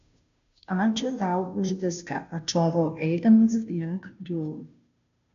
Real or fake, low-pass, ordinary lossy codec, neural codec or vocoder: fake; 7.2 kHz; AAC, 96 kbps; codec, 16 kHz, 0.5 kbps, FunCodec, trained on Chinese and English, 25 frames a second